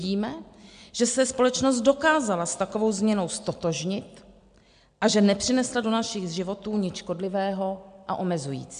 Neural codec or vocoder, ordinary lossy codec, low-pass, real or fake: none; MP3, 64 kbps; 9.9 kHz; real